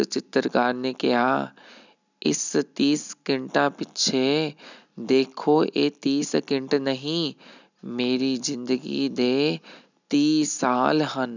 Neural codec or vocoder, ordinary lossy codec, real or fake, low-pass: none; none; real; 7.2 kHz